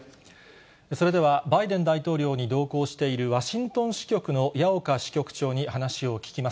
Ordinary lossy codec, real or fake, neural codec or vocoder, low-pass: none; real; none; none